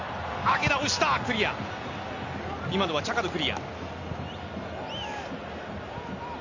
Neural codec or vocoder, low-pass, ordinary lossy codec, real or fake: none; 7.2 kHz; Opus, 64 kbps; real